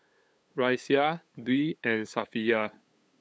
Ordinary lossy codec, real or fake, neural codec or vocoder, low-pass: none; fake; codec, 16 kHz, 8 kbps, FunCodec, trained on LibriTTS, 25 frames a second; none